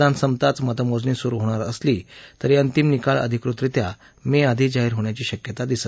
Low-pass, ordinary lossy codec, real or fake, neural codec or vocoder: none; none; real; none